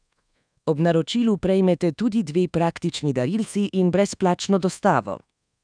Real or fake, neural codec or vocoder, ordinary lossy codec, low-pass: fake; codec, 24 kHz, 1.2 kbps, DualCodec; none; 9.9 kHz